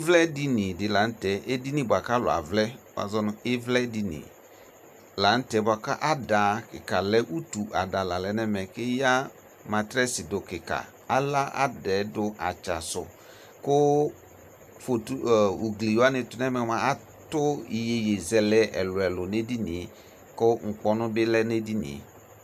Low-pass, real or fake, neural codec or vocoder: 14.4 kHz; real; none